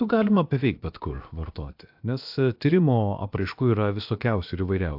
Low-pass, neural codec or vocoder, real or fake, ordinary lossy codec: 5.4 kHz; codec, 16 kHz, about 1 kbps, DyCAST, with the encoder's durations; fake; AAC, 48 kbps